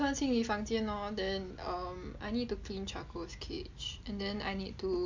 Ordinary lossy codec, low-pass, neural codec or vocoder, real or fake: MP3, 64 kbps; 7.2 kHz; none; real